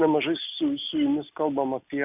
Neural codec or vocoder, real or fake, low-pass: none; real; 3.6 kHz